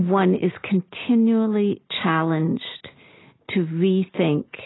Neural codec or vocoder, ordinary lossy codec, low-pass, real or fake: none; AAC, 16 kbps; 7.2 kHz; real